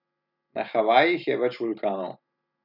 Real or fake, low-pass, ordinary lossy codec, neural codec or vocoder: real; 5.4 kHz; none; none